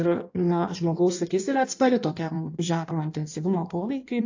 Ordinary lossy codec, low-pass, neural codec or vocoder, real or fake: AAC, 48 kbps; 7.2 kHz; codec, 16 kHz in and 24 kHz out, 1.1 kbps, FireRedTTS-2 codec; fake